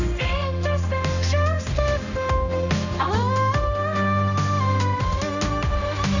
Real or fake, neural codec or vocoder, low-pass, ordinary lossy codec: fake; codec, 16 kHz, 1 kbps, X-Codec, HuBERT features, trained on general audio; 7.2 kHz; none